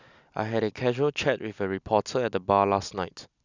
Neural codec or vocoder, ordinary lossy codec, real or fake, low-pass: none; none; real; 7.2 kHz